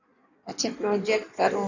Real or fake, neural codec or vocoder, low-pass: fake; codec, 16 kHz in and 24 kHz out, 1.1 kbps, FireRedTTS-2 codec; 7.2 kHz